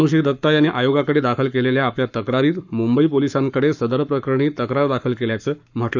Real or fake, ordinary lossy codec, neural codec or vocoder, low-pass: fake; none; codec, 44.1 kHz, 7.8 kbps, Pupu-Codec; 7.2 kHz